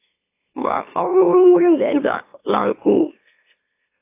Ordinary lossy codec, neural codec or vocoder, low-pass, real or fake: AAC, 24 kbps; autoencoder, 44.1 kHz, a latent of 192 numbers a frame, MeloTTS; 3.6 kHz; fake